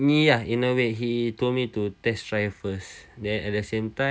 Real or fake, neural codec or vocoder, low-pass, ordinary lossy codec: real; none; none; none